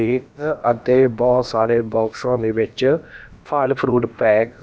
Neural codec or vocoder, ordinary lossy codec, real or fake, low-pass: codec, 16 kHz, about 1 kbps, DyCAST, with the encoder's durations; none; fake; none